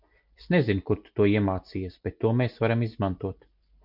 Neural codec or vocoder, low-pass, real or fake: none; 5.4 kHz; real